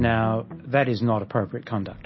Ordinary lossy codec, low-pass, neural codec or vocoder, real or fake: MP3, 24 kbps; 7.2 kHz; none; real